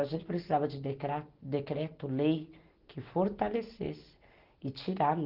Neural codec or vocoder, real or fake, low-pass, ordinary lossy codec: none; real; 5.4 kHz; Opus, 16 kbps